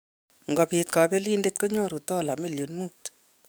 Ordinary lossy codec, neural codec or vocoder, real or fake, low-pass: none; codec, 44.1 kHz, 7.8 kbps, DAC; fake; none